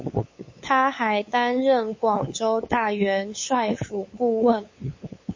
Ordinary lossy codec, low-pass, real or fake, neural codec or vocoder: MP3, 32 kbps; 7.2 kHz; fake; codec, 16 kHz, 8 kbps, FunCodec, trained on LibriTTS, 25 frames a second